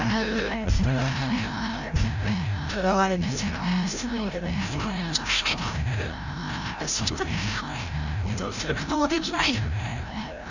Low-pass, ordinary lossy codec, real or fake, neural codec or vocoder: 7.2 kHz; none; fake; codec, 16 kHz, 0.5 kbps, FreqCodec, larger model